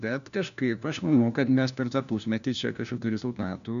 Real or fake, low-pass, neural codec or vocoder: fake; 7.2 kHz; codec, 16 kHz, 1 kbps, FunCodec, trained on LibriTTS, 50 frames a second